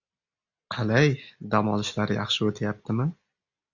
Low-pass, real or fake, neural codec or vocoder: 7.2 kHz; real; none